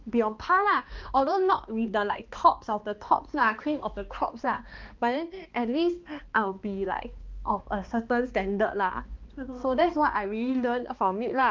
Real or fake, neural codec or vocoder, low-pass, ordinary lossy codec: fake; codec, 16 kHz, 2 kbps, X-Codec, HuBERT features, trained on balanced general audio; 7.2 kHz; Opus, 24 kbps